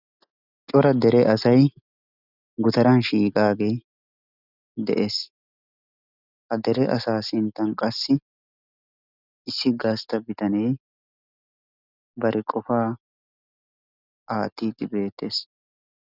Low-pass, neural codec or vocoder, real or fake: 5.4 kHz; none; real